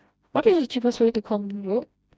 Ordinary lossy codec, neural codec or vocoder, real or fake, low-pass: none; codec, 16 kHz, 1 kbps, FreqCodec, smaller model; fake; none